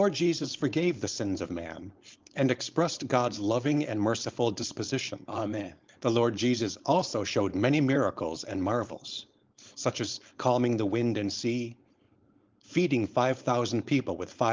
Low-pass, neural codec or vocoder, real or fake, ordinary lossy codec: 7.2 kHz; codec, 16 kHz, 4.8 kbps, FACodec; fake; Opus, 32 kbps